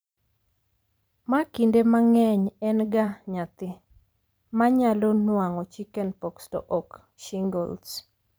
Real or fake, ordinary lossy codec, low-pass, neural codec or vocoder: fake; none; none; vocoder, 44.1 kHz, 128 mel bands every 512 samples, BigVGAN v2